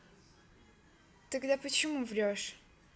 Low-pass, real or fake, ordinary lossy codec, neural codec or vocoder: none; real; none; none